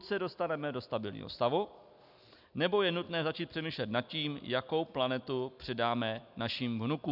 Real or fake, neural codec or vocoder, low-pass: real; none; 5.4 kHz